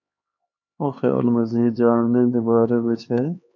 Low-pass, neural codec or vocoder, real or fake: 7.2 kHz; codec, 16 kHz, 2 kbps, X-Codec, HuBERT features, trained on LibriSpeech; fake